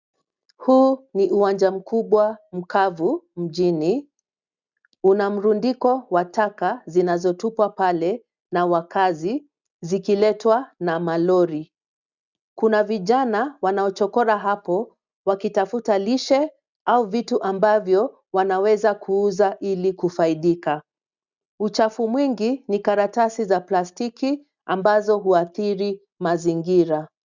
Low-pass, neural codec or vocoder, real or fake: 7.2 kHz; none; real